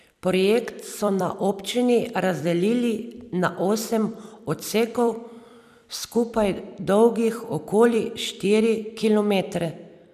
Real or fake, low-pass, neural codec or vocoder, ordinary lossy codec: fake; 14.4 kHz; vocoder, 44.1 kHz, 128 mel bands every 256 samples, BigVGAN v2; none